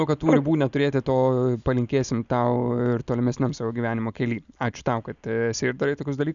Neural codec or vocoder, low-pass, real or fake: none; 7.2 kHz; real